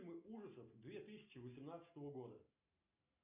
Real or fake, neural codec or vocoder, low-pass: real; none; 3.6 kHz